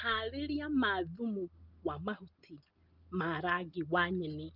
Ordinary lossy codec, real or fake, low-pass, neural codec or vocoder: Opus, 32 kbps; real; 5.4 kHz; none